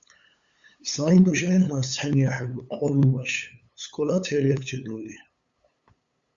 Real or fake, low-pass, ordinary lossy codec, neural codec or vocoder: fake; 7.2 kHz; Opus, 64 kbps; codec, 16 kHz, 8 kbps, FunCodec, trained on LibriTTS, 25 frames a second